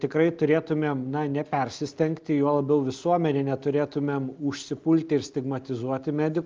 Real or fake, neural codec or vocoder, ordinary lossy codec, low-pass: real; none; Opus, 24 kbps; 7.2 kHz